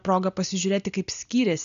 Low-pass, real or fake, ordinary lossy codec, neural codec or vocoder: 7.2 kHz; real; MP3, 96 kbps; none